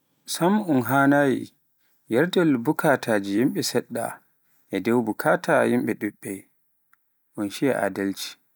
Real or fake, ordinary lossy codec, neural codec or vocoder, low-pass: real; none; none; none